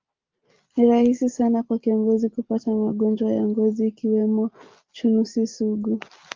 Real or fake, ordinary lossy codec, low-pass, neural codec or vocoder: real; Opus, 32 kbps; 7.2 kHz; none